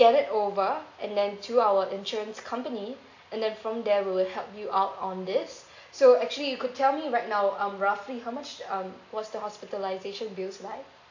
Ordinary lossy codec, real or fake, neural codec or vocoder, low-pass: AAC, 48 kbps; real; none; 7.2 kHz